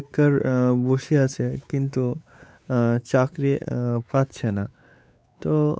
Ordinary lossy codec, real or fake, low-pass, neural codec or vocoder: none; fake; none; codec, 16 kHz, 4 kbps, X-Codec, WavLM features, trained on Multilingual LibriSpeech